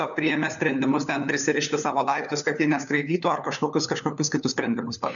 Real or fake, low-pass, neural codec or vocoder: fake; 7.2 kHz; codec, 16 kHz, 4 kbps, FunCodec, trained on LibriTTS, 50 frames a second